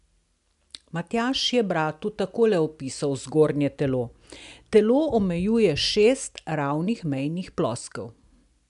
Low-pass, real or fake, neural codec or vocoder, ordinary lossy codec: 10.8 kHz; real; none; MP3, 96 kbps